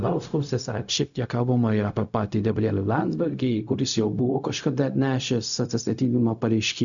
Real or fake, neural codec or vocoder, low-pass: fake; codec, 16 kHz, 0.4 kbps, LongCat-Audio-Codec; 7.2 kHz